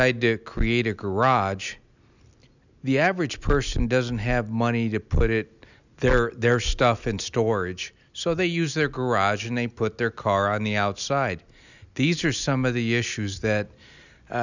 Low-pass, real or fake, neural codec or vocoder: 7.2 kHz; real; none